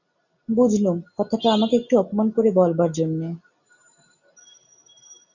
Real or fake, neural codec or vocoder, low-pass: real; none; 7.2 kHz